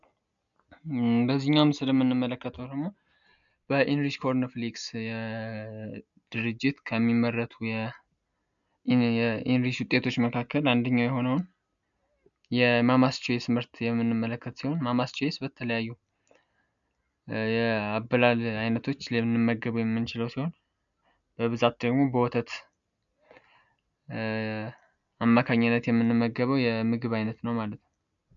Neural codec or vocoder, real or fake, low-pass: none; real; 7.2 kHz